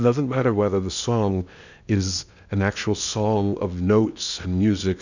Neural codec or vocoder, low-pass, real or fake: codec, 16 kHz in and 24 kHz out, 0.8 kbps, FocalCodec, streaming, 65536 codes; 7.2 kHz; fake